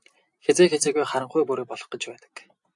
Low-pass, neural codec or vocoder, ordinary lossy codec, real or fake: 10.8 kHz; vocoder, 44.1 kHz, 128 mel bands every 256 samples, BigVGAN v2; AAC, 64 kbps; fake